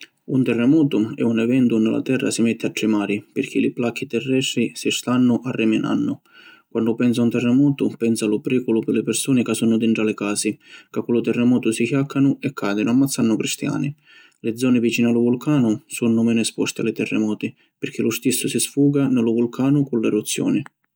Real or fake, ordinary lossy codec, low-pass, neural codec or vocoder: real; none; none; none